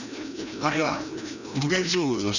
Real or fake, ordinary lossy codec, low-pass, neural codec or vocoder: fake; none; 7.2 kHz; codec, 16 kHz, 1 kbps, FreqCodec, larger model